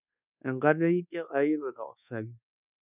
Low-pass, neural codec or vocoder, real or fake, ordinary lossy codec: 3.6 kHz; codec, 24 kHz, 1.2 kbps, DualCodec; fake; none